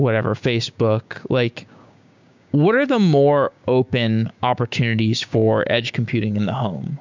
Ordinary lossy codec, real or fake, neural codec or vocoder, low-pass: MP3, 64 kbps; fake; codec, 16 kHz, 6 kbps, DAC; 7.2 kHz